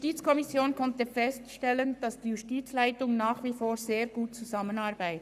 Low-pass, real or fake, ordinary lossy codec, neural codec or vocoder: 14.4 kHz; fake; none; codec, 44.1 kHz, 7.8 kbps, Pupu-Codec